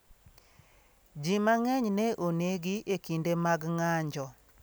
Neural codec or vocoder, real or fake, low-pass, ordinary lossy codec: none; real; none; none